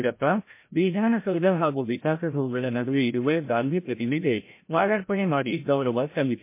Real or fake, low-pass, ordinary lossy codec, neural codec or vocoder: fake; 3.6 kHz; MP3, 24 kbps; codec, 16 kHz, 0.5 kbps, FreqCodec, larger model